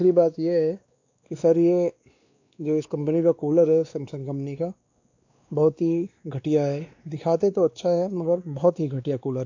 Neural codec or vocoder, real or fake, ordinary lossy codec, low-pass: codec, 16 kHz, 2 kbps, X-Codec, WavLM features, trained on Multilingual LibriSpeech; fake; AAC, 48 kbps; 7.2 kHz